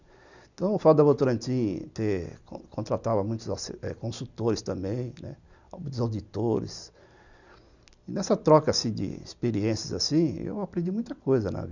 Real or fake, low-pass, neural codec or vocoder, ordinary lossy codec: real; 7.2 kHz; none; none